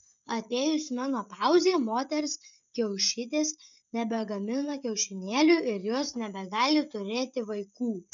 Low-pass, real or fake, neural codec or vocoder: 7.2 kHz; fake; codec, 16 kHz, 16 kbps, FreqCodec, smaller model